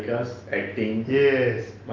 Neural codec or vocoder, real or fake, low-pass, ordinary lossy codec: none; real; 7.2 kHz; Opus, 24 kbps